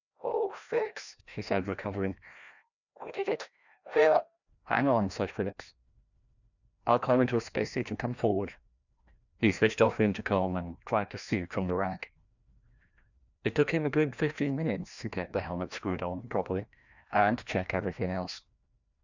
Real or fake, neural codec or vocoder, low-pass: fake; codec, 16 kHz, 1 kbps, FreqCodec, larger model; 7.2 kHz